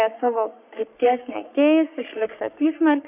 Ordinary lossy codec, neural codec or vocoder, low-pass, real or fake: AAC, 32 kbps; codec, 44.1 kHz, 3.4 kbps, Pupu-Codec; 3.6 kHz; fake